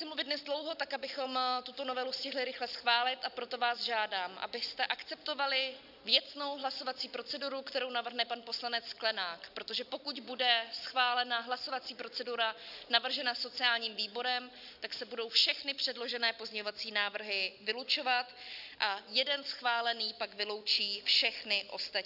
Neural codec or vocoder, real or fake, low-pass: none; real; 5.4 kHz